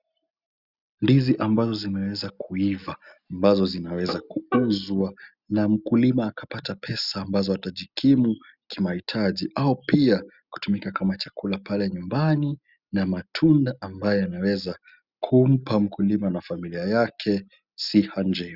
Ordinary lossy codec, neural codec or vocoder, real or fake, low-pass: Opus, 64 kbps; none; real; 5.4 kHz